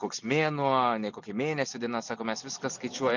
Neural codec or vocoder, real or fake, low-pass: none; real; 7.2 kHz